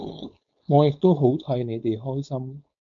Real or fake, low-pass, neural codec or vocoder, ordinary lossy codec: fake; 7.2 kHz; codec, 16 kHz, 4.8 kbps, FACodec; MP3, 64 kbps